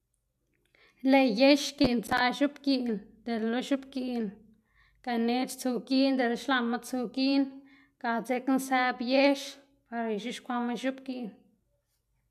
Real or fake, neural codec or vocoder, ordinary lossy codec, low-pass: real; none; none; 14.4 kHz